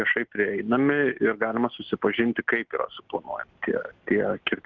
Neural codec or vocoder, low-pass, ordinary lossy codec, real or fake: none; 7.2 kHz; Opus, 16 kbps; real